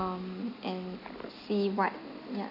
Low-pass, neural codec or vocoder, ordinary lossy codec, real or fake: 5.4 kHz; none; none; real